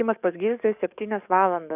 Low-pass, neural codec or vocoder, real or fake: 3.6 kHz; codec, 44.1 kHz, 7.8 kbps, DAC; fake